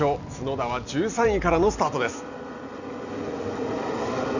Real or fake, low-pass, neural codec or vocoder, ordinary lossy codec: real; 7.2 kHz; none; none